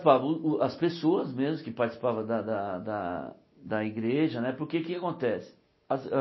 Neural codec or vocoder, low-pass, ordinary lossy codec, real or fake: none; 7.2 kHz; MP3, 24 kbps; real